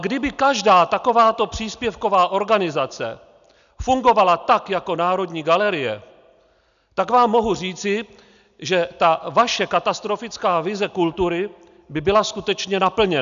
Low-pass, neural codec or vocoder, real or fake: 7.2 kHz; none; real